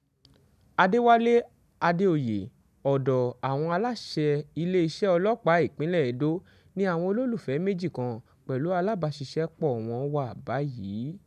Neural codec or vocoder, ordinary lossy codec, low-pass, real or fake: none; none; 14.4 kHz; real